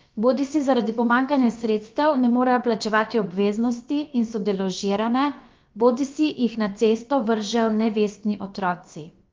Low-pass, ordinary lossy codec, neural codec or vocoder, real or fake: 7.2 kHz; Opus, 24 kbps; codec, 16 kHz, about 1 kbps, DyCAST, with the encoder's durations; fake